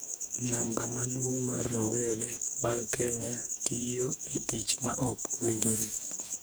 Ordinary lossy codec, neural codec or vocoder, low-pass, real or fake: none; codec, 44.1 kHz, 2.6 kbps, DAC; none; fake